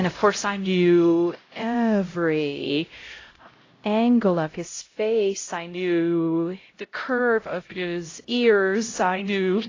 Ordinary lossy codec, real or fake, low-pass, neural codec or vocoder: AAC, 32 kbps; fake; 7.2 kHz; codec, 16 kHz, 0.5 kbps, X-Codec, HuBERT features, trained on LibriSpeech